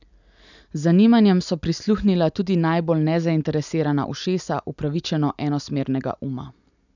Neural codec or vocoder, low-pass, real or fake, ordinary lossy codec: none; 7.2 kHz; real; none